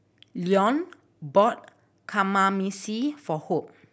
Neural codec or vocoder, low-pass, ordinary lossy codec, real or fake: none; none; none; real